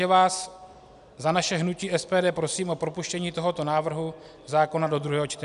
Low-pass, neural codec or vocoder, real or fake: 10.8 kHz; none; real